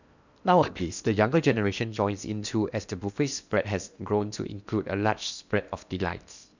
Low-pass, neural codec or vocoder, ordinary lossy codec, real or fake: 7.2 kHz; codec, 16 kHz in and 24 kHz out, 0.8 kbps, FocalCodec, streaming, 65536 codes; none; fake